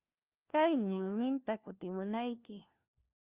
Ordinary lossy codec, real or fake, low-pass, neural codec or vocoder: Opus, 32 kbps; fake; 3.6 kHz; codec, 16 kHz, 1 kbps, FunCodec, trained on LibriTTS, 50 frames a second